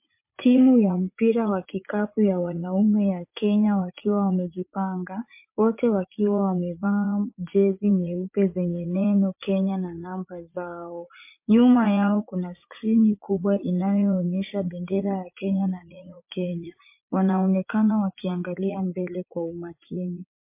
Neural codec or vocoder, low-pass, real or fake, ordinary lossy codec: vocoder, 44.1 kHz, 80 mel bands, Vocos; 3.6 kHz; fake; MP3, 24 kbps